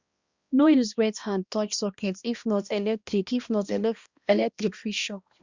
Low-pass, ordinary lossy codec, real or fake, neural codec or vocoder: 7.2 kHz; Opus, 64 kbps; fake; codec, 16 kHz, 1 kbps, X-Codec, HuBERT features, trained on balanced general audio